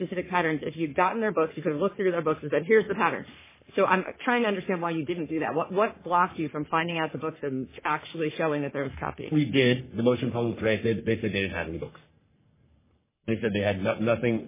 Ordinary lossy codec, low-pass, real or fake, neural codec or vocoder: MP3, 16 kbps; 3.6 kHz; fake; codec, 44.1 kHz, 3.4 kbps, Pupu-Codec